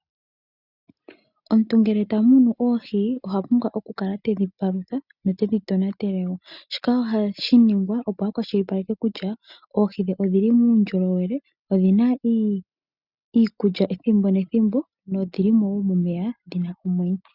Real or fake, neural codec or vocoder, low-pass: real; none; 5.4 kHz